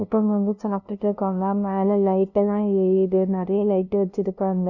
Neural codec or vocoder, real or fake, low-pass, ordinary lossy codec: codec, 16 kHz, 0.5 kbps, FunCodec, trained on LibriTTS, 25 frames a second; fake; 7.2 kHz; none